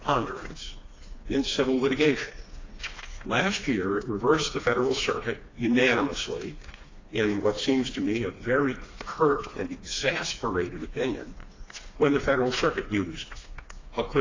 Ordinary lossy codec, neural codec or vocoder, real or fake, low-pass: AAC, 32 kbps; codec, 16 kHz, 2 kbps, FreqCodec, smaller model; fake; 7.2 kHz